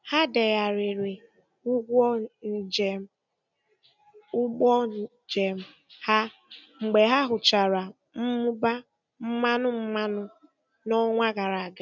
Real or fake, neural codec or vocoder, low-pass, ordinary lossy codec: real; none; 7.2 kHz; none